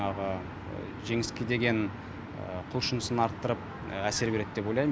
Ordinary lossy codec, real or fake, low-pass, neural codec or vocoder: none; real; none; none